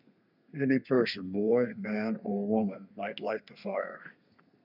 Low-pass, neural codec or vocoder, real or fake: 5.4 kHz; codec, 32 kHz, 1.9 kbps, SNAC; fake